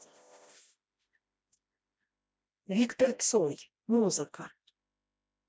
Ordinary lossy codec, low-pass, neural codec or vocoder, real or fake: none; none; codec, 16 kHz, 1 kbps, FreqCodec, smaller model; fake